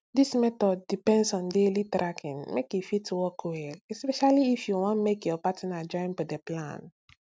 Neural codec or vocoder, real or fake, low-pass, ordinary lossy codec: none; real; none; none